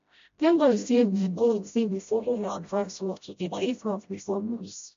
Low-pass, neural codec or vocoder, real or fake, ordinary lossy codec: 7.2 kHz; codec, 16 kHz, 0.5 kbps, FreqCodec, smaller model; fake; MP3, 48 kbps